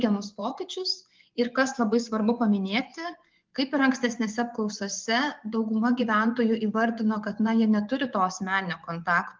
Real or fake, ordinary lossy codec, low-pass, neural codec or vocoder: fake; Opus, 24 kbps; 7.2 kHz; vocoder, 24 kHz, 100 mel bands, Vocos